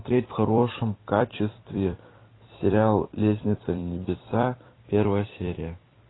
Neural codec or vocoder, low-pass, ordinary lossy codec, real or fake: vocoder, 44.1 kHz, 128 mel bands every 256 samples, BigVGAN v2; 7.2 kHz; AAC, 16 kbps; fake